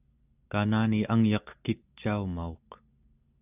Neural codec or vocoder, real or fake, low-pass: none; real; 3.6 kHz